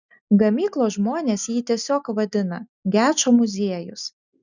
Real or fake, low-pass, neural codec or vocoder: real; 7.2 kHz; none